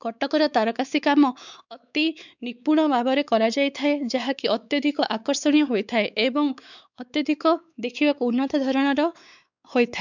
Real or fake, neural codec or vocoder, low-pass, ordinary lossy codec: fake; codec, 16 kHz, 4 kbps, X-Codec, WavLM features, trained on Multilingual LibriSpeech; 7.2 kHz; none